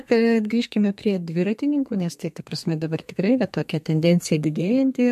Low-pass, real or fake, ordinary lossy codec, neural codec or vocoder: 14.4 kHz; fake; MP3, 64 kbps; codec, 32 kHz, 1.9 kbps, SNAC